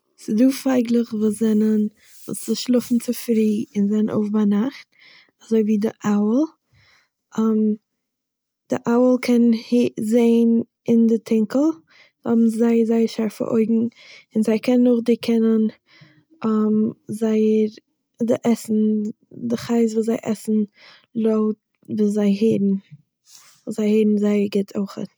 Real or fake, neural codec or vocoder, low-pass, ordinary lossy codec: real; none; none; none